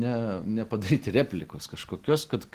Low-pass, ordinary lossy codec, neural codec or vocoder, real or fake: 14.4 kHz; Opus, 16 kbps; none; real